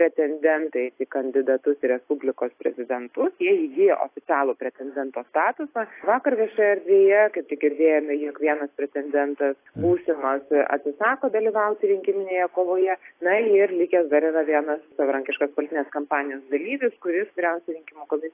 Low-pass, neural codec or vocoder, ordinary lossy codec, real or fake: 3.6 kHz; none; AAC, 24 kbps; real